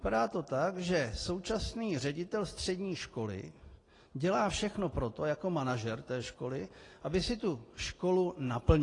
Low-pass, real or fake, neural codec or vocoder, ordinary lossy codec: 10.8 kHz; real; none; AAC, 32 kbps